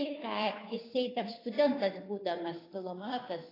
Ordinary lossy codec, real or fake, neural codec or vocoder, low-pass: AAC, 24 kbps; fake; codec, 16 kHz, 2 kbps, FunCodec, trained on Chinese and English, 25 frames a second; 5.4 kHz